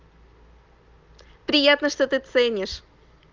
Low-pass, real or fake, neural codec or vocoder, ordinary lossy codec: 7.2 kHz; real; none; Opus, 32 kbps